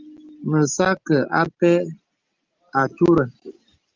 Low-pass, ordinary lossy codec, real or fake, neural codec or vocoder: 7.2 kHz; Opus, 32 kbps; real; none